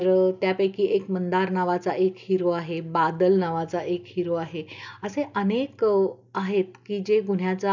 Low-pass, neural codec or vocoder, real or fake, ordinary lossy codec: 7.2 kHz; none; real; none